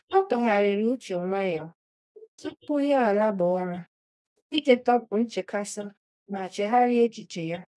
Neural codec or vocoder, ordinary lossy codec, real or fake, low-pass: codec, 24 kHz, 0.9 kbps, WavTokenizer, medium music audio release; none; fake; none